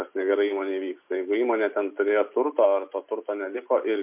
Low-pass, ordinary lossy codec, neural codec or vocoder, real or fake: 3.6 kHz; MP3, 32 kbps; none; real